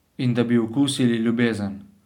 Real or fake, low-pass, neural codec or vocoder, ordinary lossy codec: real; 19.8 kHz; none; none